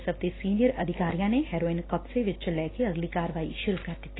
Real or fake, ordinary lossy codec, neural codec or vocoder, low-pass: real; AAC, 16 kbps; none; 7.2 kHz